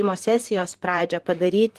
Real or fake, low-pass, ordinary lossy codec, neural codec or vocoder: fake; 14.4 kHz; Opus, 24 kbps; vocoder, 44.1 kHz, 128 mel bands, Pupu-Vocoder